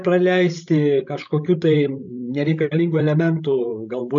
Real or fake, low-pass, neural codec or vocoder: fake; 7.2 kHz; codec, 16 kHz, 16 kbps, FreqCodec, larger model